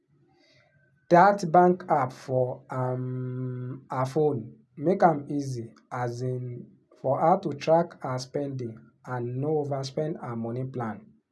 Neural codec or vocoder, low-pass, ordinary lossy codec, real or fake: none; none; none; real